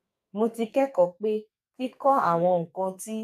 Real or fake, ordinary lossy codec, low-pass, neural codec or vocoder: fake; AAC, 96 kbps; 14.4 kHz; codec, 44.1 kHz, 2.6 kbps, SNAC